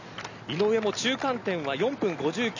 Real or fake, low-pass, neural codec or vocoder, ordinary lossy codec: real; 7.2 kHz; none; none